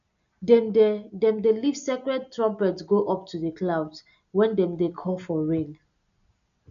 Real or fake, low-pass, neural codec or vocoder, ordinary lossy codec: real; 7.2 kHz; none; none